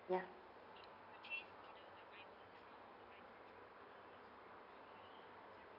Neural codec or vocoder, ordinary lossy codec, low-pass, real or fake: none; none; 5.4 kHz; real